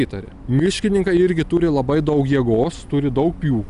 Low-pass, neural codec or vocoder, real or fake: 10.8 kHz; none; real